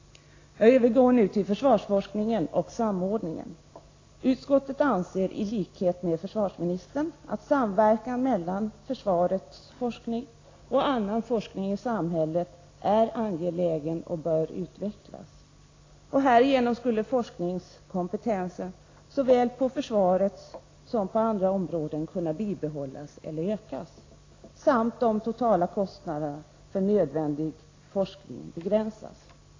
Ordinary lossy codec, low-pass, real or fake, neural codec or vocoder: AAC, 32 kbps; 7.2 kHz; real; none